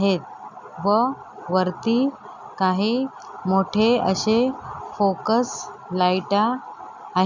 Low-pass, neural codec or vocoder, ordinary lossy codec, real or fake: 7.2 kHz; none; none; real